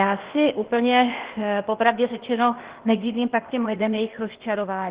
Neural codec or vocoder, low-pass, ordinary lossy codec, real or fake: codec, 16 kHz, 0.8 kbps, ZipCodec; 3.6 kHz; Opus, 16 kbps; fake